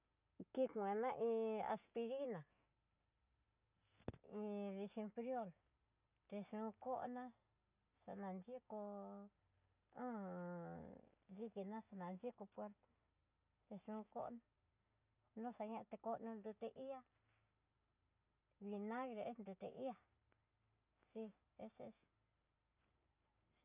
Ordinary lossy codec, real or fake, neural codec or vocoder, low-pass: none; real; none; 3.6 kHz